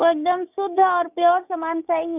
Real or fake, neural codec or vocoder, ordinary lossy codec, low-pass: fake; codec, 16 kHz, 6 kbps, DAC; none; 3.6 kHz